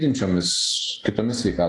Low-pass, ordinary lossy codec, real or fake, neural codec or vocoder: 10.8 kHz; AAC, 48 kbps; real; none